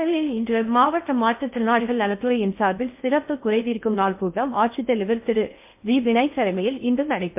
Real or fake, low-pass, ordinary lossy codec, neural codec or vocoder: fake; 3.6 kHz; MP3, 32 kbps; codec, 16 kHz in and 24 kHz out, 0.6 kbps, FocalCodec, streaming, 4096 codes